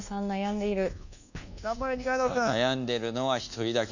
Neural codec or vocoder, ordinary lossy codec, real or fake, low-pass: codec, 24 kHz, 1.2 kbps, DualCodec; none; fake; 7.2 kHz